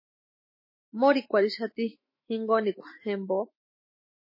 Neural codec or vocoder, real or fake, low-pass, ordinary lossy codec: autoencoder, 48 kHz, 128 numbers a frame, DAC-VAE, trained on Japanese speech; fake; 5.4 kHz; MP3, 24 kbps